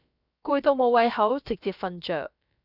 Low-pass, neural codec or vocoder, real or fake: 5.4 kHz; codec, 16 kHz, about 1 kbps, DyCAST, with the encoder's durations; fake